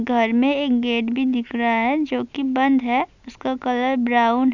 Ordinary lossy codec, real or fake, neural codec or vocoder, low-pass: none; real; none; 7.2 kHz